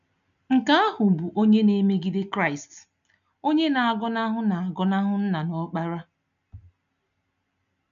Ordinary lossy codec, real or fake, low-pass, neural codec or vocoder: none; real; 7.2 kHz; none